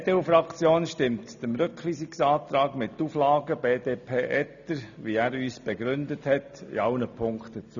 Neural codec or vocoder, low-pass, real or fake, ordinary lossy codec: none; 7.2 kHz; real; none